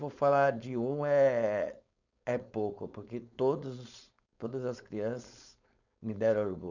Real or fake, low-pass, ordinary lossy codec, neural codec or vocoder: fake; 7.2 kHz; none; codec, 16 kHz, 4.8 kbps, FACodec